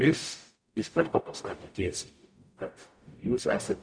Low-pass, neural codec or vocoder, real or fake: 9.9 kHz; codec, 44.1 kHz, 0.9 kbps, DAC; fake